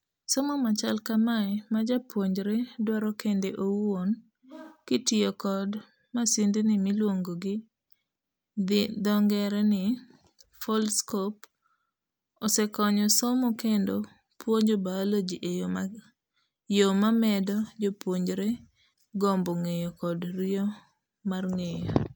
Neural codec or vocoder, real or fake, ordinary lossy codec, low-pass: none; real; none; none